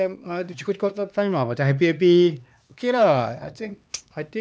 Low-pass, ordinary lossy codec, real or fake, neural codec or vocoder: none; none; fake; codec, 16 kHz, 2 kbps, X-Codec, HuBERT features, trained on LibriSpeech